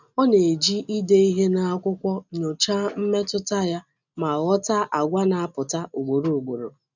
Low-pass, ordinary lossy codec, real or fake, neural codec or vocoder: 7.2 kHz; none; real; none